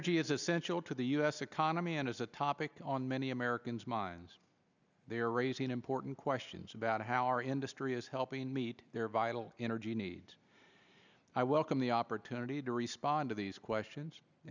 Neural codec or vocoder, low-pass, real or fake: none; 7.2 kHz; real